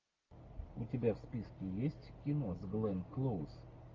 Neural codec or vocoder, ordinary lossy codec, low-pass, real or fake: none; Opus, 32 kbps; 7.2 kHz; real